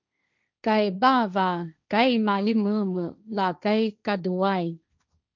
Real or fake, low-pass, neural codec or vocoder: fake; 7.2 kHz; codec, 16 kHz, 1.1 kbps, Voila-Tokenizer